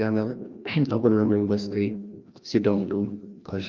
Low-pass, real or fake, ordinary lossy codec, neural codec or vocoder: 7.2 kHz; fake; Opus, 24 kbps; codec, 16 kHz, 1 kbps, FreqCodec, larger model